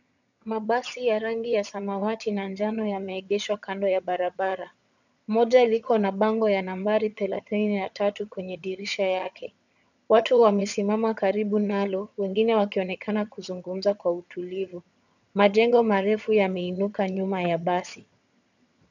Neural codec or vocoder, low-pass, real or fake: vocoder, 22.05 kHz, 80 mel bands, HiFi-GAN; 7.2 kHz; fake